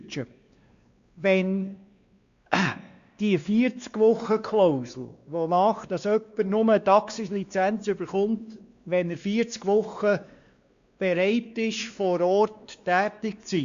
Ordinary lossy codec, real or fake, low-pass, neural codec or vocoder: Opus, 64 kbps; fake; 7.2 kHz; codec, 16 kHz, 2 kbps, X-Codec, WavLM features, trained on Multilingual LibriSpeech